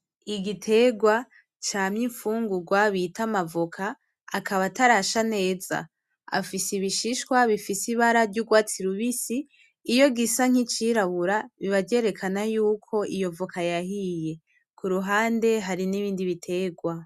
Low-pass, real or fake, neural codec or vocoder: 14.4 kHz; real; none